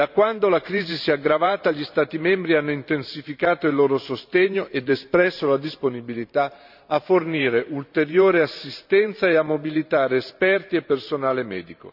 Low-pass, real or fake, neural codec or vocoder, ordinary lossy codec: 5.4 kHz; fake; vocoder, 44.1 kHz, 128 mel bands every 512 samples, BigVGAN v2; none